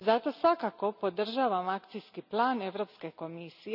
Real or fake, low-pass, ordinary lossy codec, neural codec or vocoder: real; 5.4 kHz; none; none